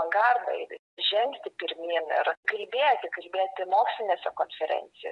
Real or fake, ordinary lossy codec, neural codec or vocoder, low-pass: fake; MP3, 64 kbps; vocoder, 48 kHz, 128 mel bands, Vocos; 10.8 kHz